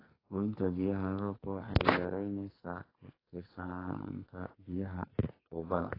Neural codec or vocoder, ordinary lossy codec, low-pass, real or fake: codec, 44.1 kHz, 2.6 kbps, SNAC; AAC, 24 kbps; 5.4 kHz; fake